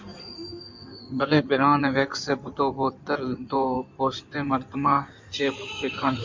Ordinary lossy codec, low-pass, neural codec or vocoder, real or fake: MP3, 64 kbps; 7.2 kHz; codec, 16 kHz in and 24 kHz out, 2.2 kbps, FireRedTTS-2 codec; fake